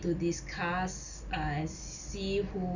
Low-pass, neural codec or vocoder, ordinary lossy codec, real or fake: 7.2 kHz; none; none; real